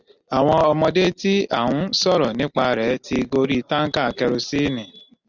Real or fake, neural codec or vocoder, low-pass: real; none; 7.2 kHz